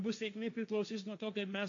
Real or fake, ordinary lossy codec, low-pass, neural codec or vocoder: fake; AAC, 64 kbps; 7.2 kHz; codec, 16 kHz, 1.1 kbps, Voila-Tokenizer